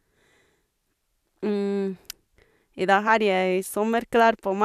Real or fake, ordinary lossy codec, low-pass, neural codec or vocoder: fake; none; 14.4 kHz; vocoder, 44.1 kHz, 128 mel bands every 512 samples, BigVGAN v2